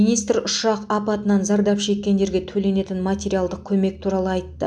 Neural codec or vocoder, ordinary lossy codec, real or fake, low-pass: none; none; real; none